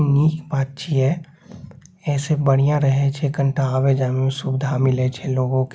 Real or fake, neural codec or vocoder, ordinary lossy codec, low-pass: real; none; none; none